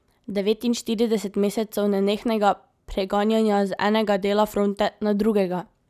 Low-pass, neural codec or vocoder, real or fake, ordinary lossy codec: 14.4 kHz; none; real; none